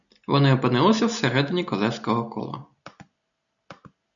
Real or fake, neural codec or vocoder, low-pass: real; none; 7.2 kHz